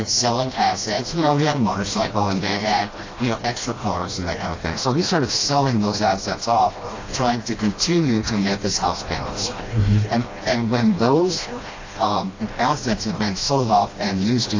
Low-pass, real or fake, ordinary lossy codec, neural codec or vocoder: 7.2 kHz; fake; AAC, 32 kbps; codec, 16 kHz, 1 kbps, FreqCodec, smaller model